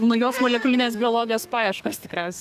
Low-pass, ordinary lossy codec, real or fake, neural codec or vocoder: 14.4 kHz; AAC, 96 kbps; fake; codec, 32 kHz, 1.9 kbps, SNAC